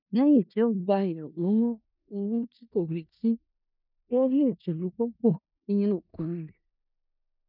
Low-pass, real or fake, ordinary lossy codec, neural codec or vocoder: 5.4 kHz; fake; none; codec, 16 kHz in and 24 kHz out, 0.4 kbps, LongCat-Audio-Codec, four codebook decoder